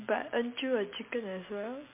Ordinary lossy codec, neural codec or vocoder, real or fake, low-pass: MP3, 24 kbps; none; real; 3.6 kHz